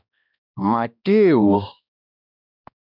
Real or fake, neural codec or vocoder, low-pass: fake; codec, 16 kHz, 1 kbps, X-Codec, HuBERT features, trained on balanced general audio; 5.4 kHz